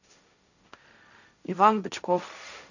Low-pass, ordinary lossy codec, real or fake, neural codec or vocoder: 7.2 kHz; none; fake; codec, 16 kHz, 1.1 kbps, Voila-Tokenizer